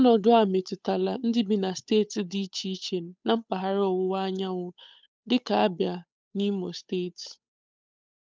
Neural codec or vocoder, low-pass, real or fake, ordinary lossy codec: codec, 16 kHz, 8 kbps, FunCodec, trained on Chinese and English, 25 frames a second; none; fake; none